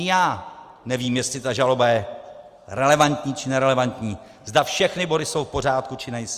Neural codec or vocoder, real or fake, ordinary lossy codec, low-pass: none; real; Opus, 32 kbps; 14.4 kHz